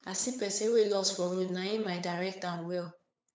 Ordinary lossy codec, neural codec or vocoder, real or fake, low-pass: none; codec, 16 kHz, 8 kbps, FunCodec, trained on LibriTTS, 25 frames a second; fake; none